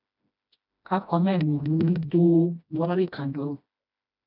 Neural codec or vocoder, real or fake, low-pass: codec, 16 kHz, 1 kbps, FreqCodec, smaller model; fake; 5.4 kHz